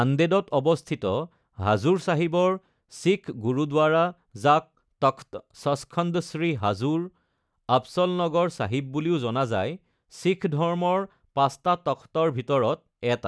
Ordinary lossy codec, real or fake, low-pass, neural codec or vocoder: none; real; none; none